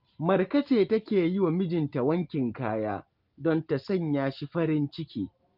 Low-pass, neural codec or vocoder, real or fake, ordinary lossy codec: 5.4 kHz; none; real; Opus, 32 kbps